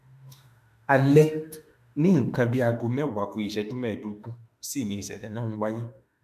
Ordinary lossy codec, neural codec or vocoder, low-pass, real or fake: none; autoencoder, 48 kHz, 32 numbers a frame, DAC-VAE, trained on Japanese speech; 14.4 kHz; fake